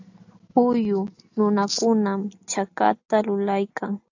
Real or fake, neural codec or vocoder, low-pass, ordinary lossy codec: real; none; 7.2 kHz; AAC, 48 kbps